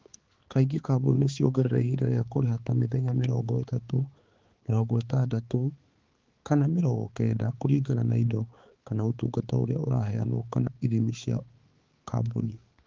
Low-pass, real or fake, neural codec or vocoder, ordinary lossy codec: 7.2 kHz; fake; codec, 16 kHz, 4 kbps, X-Codec, HuBERT features, trained on balanced general audio; Opus, 16 kbps